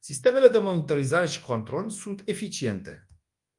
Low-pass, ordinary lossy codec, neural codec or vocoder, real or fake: 10.8 kHz; Opus, 32 kbps; codec, 24 kHz, 0.9 kbps, WavTokenizer, large speech release; fake